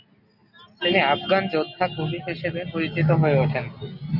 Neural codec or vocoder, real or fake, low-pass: none; real; 5.4 kHz